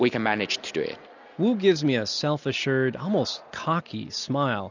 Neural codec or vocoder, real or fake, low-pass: none; real; 7.2 kHz